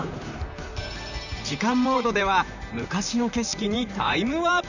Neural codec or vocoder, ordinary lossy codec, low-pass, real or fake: vocoder, 44.1 kHz, 128 mel bands, Pupu-Vocoder; none; 7.2 kHz; fake